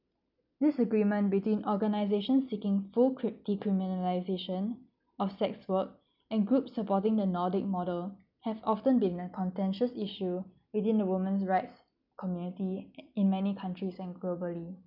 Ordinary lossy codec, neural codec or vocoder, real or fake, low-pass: none; none; real; 5.4 kHz